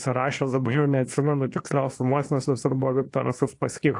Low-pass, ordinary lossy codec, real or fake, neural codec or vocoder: 10.8 kHz; AAC, 48 kbps; fake; codec, 24 kHz, 0.9 kbps, WavTokenizer, small release